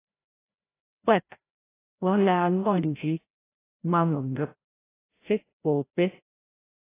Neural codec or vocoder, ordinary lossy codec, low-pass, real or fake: codec, 16 kHz, 0.5 kbps, FreqCodec, larger model; AAC, 24 kbps; 3.6 kHz; fake